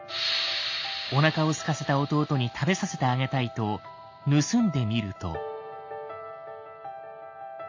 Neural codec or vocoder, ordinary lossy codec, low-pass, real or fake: none; AAC, 48 kbps; 7.2 kHz; real